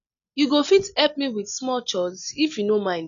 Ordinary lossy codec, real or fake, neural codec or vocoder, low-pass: none; real; none; 7.2 kHz